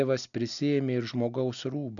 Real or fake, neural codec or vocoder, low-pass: real; none; 7.2 kHz